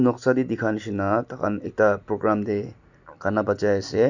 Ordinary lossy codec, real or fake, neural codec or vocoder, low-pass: none; real; none; 7.2 kHz